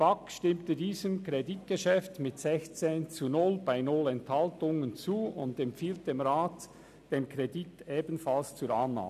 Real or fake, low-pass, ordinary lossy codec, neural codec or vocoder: real; 14.4 kHz; none; none